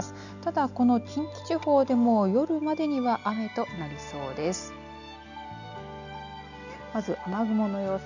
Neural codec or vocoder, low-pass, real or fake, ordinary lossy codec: none; 7.2 kHz; real; none